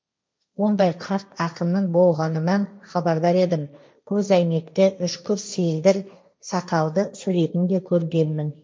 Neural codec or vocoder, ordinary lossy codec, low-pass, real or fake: codec, 16 kHz, 1.1 kbps, Voila-Tokenizer; none; none; fake